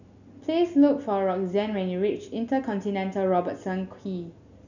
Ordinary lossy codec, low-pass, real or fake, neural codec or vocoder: none; 7.2 kHz; real; none